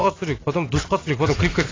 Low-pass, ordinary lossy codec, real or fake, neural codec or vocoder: 7.2 kHz; AAC, 32 kbps; real; none